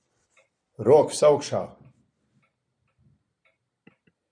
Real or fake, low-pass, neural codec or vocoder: real; 9.9 kHz; none